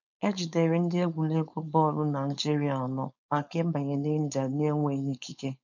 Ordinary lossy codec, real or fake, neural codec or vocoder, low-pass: none; fake; codec, 16 kHz, 4.8 kbps, FACodec; 7.2 kHz